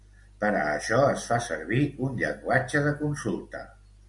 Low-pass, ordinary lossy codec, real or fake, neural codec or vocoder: 10.8 kHz; MP3, 96 kbps; real; none